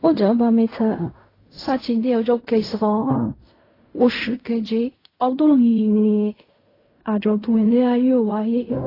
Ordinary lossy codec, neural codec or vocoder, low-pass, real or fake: AAC, 24 kbps; codec, 16 kHz in and 24 kHz out, 0.4 kbps, LongCat-Audio-Codec, fine tuned four codebook decoder; 5.4 kHz; fake